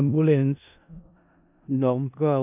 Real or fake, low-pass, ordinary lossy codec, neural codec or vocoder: fake; 3.6 kHz; none; codec, 16 kHz in and 24 kHz out, 0.4 kbps, LongCat-Audio-Codec, four codebook decoder